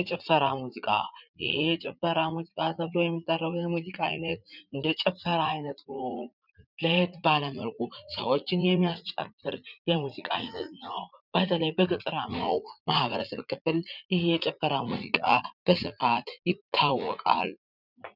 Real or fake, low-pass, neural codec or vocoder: fake; 5.4 kHz; vocoder, 44.1 kHz, 80 mel bands, Vocos